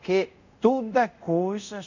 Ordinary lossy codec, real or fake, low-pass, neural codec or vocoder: none; fake; 7.2 kHz; codec, 24 kHz, 0.5 kbps, DualCodec